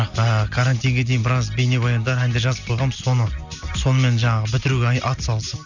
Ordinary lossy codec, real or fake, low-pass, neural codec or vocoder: none; real; 7.2 kHz; none